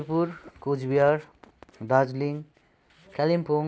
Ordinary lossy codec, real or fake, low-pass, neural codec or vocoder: none; real; none; none